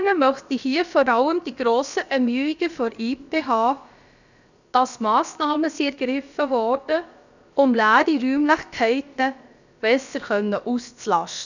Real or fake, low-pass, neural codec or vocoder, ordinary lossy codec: fake; 7.2 kHz; codec, 16 kHz, about 1 kbps, DyCAST, with the encoder's durations; none